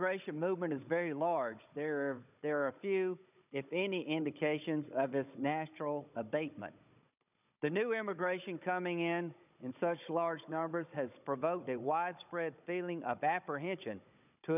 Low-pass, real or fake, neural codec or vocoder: 3.6 kHz; fake; codec, 16 kHz, 16 kbps, FunCodec, trained on Chinese and English, 50 frames a second